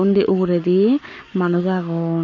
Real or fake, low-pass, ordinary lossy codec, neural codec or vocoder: fake; 7.2 kHz; none; codec, 44.1 kHz, 7.8 kbps, Pupu-Codec